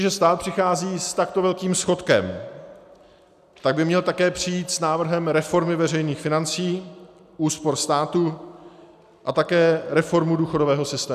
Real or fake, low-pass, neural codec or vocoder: real; 14.4 kHz; none